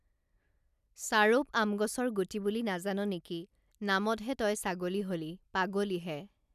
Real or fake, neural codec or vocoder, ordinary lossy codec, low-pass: real; none; none; 14.4 kHz